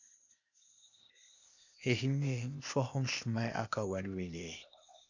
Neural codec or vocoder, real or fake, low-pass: codec, 16 kHz, 0.8 kbps, ZipCodec; fake; 7.2 kHz